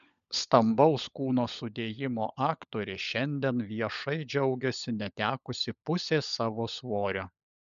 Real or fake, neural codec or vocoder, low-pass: fake; codec, 16 kHz, 16 kbps, FunCodec, trained on LibriTTS, 50 frames a second; 7.2 kHz